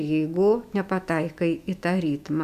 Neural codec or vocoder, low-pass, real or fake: autoencoder, 48 kHz, 128 numbers a frame, DAC-VAE, trained on Japanese speech; 14.4 kHz; fake